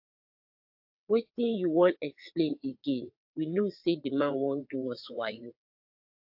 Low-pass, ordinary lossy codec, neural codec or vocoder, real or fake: 5.4 kHz; AAC, 48 kbps; vocoder, 22.05 kHz, 80 mel bands, WaveNeXt; fake